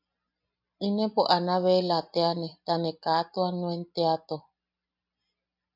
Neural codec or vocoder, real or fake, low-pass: none; real; 5.4 kHz